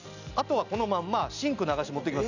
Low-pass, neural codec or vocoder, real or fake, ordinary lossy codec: 7.2 kHz; none; real; none